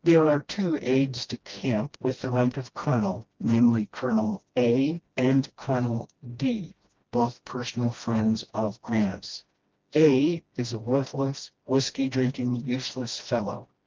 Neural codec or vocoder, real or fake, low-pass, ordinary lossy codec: codec, 16 kHz, 1 kbps, FreqCodec, smaller model; fake; 7.2 kHz; Opus, 24 kbps